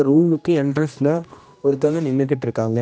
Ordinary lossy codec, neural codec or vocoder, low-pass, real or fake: none; codec, 16 kHz, 1 kbps, X-Codec, HuBERT features, trained on general audio; none; fake